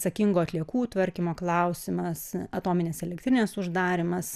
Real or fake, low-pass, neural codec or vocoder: real; 14.4 kHz; none